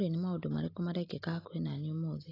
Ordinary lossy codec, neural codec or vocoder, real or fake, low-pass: AAC, 24 kbps; none; real; 5.4 kHz